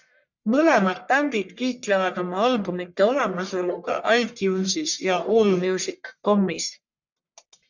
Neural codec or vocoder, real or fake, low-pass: codec, 44.1 kHz, 1.7 kbps, Pupu-Codec; fake; 7.2 kHz